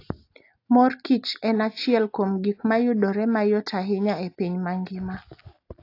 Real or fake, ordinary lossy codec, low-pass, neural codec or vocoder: real; AAC, 32 kbps; 5.4 kHz; none